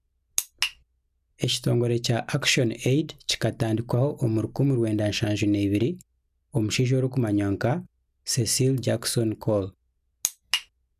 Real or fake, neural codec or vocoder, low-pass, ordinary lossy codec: real; none; 14.4 kHz; none